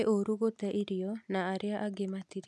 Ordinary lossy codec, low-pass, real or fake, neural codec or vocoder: none; none; real; none